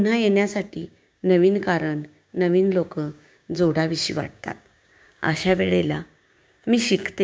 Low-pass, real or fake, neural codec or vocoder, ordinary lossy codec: none; fake; codec, 16 kHz, 6 kbps, DAC; none